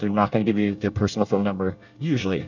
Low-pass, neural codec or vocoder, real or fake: 7.2 kHz; codec, 24 kHz, 1 kbps, SNAC; fake